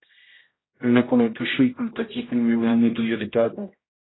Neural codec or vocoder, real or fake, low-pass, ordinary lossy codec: codec, 16 kHz, 0.5 kbps, X-Codec, HuBERT features, trained on general audio; fake; 7.2 kHz; AAC, 16 kbps